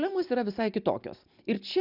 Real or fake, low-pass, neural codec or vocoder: real; 5.4 kHz; none